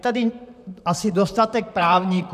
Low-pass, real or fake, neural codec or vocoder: 14.4 kHz; fake; vocoder, 44.1 kHz, 128 mel bands, Pupu-Vocoder